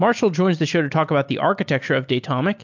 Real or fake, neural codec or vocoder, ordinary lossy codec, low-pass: real; none; MP3, 64 kbps; 7.2 kHz